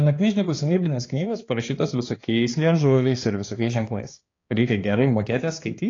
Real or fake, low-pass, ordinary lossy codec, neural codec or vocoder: fake; 7.2 kHz; AAC, 32 kbps; codec, 16 kHz, 2 kbps, X-Codec, HuBERT features, trained on balanced general audio